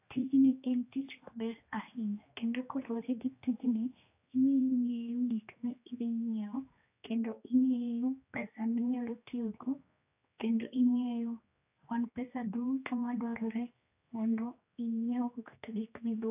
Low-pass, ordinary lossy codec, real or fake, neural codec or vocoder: 3.6 kHz; AAC, 32 kbps; fake; codec, 16 kHz, 2 kbps, X-Codec, HuBERT features, trained on general audio